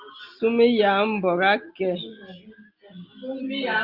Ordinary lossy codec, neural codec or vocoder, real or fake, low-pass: Opus, 24 kbps; none; real; 5.4 kHz